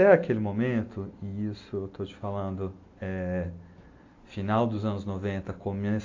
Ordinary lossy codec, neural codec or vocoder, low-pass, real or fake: AAC, 48 kbps; none; 7.2 kHz; real